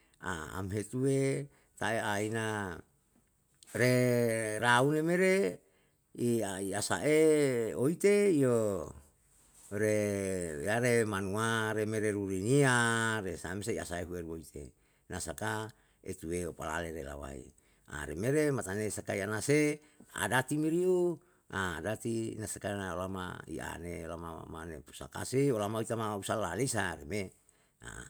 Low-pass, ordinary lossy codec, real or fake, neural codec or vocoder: none; none; real; none